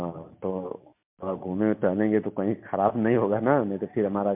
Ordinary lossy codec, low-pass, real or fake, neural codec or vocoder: MP3, 32 kbps; 3.6 kHz; real; none